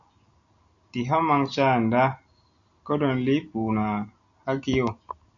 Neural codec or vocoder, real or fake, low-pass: none; real; 7.2 kHz